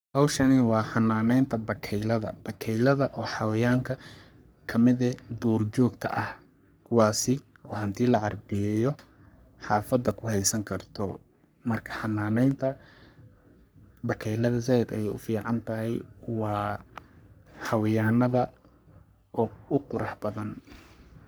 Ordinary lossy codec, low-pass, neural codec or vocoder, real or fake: none; none; codec, 44.1 kHz, 3.4 kbps, Pupu-Codec; fake